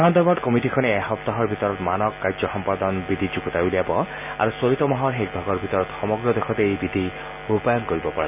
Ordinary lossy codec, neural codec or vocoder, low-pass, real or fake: none; none; 3.6 kHz; real